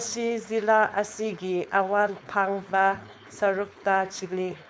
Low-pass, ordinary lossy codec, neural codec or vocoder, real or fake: none; none; codec, 16 kHz, 4.8 kbps, FACodec; fake